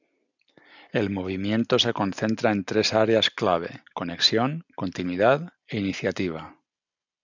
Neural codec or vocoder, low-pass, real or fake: none; 7.2 kHz; real